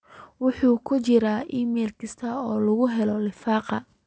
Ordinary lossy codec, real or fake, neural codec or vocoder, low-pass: none; real; none; none